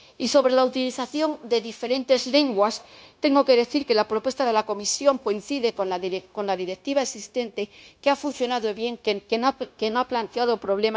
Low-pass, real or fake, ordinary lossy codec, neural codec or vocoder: none; fake; none; codec, 16 kHz, 0.9 kbps, LongCat-Audio-Codec